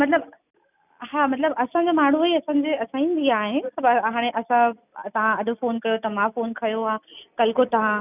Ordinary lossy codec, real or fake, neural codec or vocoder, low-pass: none; real; none; 3.6 kHz